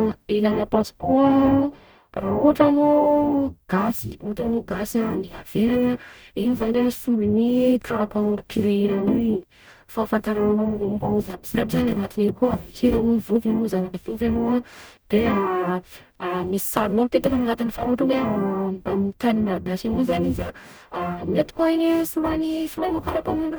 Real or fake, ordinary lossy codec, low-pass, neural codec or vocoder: fake; none; none; codec, 44.1 kHz, 0.9 kbps, DAC